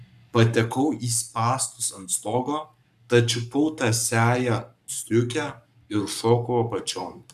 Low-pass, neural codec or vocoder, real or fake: 14.4 kHz; codec, 44.1 kHz, 7.8 kbps, Pupu-Codec; fake